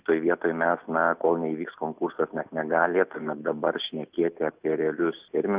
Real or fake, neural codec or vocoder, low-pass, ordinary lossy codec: real; none; 3.6 kHz; Opus, 64 kbps